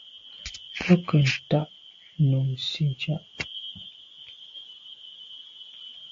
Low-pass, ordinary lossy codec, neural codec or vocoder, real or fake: 7.2 kHz; AAC, 48 kbps; none; real